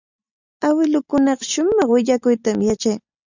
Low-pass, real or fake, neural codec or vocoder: 7.2 kHz; real; none